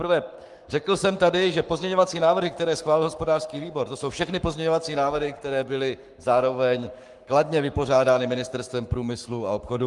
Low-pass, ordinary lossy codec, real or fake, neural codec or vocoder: 10.8 kHz; Opus, 32 kbps; fake; codec, 44.1 kHz, 7.8 kbps, Pupu-Codec